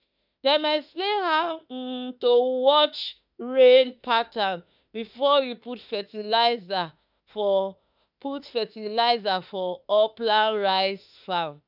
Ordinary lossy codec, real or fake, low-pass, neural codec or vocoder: none; fake; 5.4 kHz; autoencoder, 48 kHz, 32 numbers a frame, DAC-VAE, trained on Japanese speech